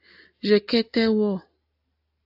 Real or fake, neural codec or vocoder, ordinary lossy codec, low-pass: real; none; AAC, 48 kbps; 5.4 kHz